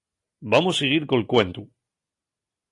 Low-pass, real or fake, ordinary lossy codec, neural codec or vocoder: 10.8 kHz; real; AAC, 48 kbps; none